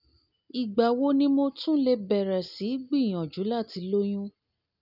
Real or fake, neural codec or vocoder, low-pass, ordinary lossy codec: real; none; 5.4 kHz; none